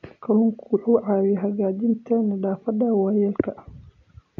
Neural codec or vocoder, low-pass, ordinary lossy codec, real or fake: none; 7.2 kHz; none; real